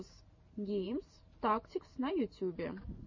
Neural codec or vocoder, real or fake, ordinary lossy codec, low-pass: vocoder, 44.1 kHz, 128 mel bands every 512 samples, BigVGAN v2; fake; MP3, 32 kbps; 7.2 kHz